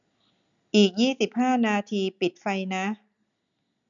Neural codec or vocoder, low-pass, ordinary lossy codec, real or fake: none; 7.2 kHz; none; real